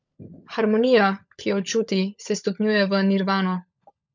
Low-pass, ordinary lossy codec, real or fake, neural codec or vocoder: 7.2 kHz; none; fake; codec, 16 kHz, 16 kbps, FunCodec, trained on LibriTTS, 50 frames a second